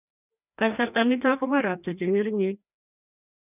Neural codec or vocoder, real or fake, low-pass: codec, 16 kHz, 1 kbps, FreqCodec, larger model; fake; 3.6 kHz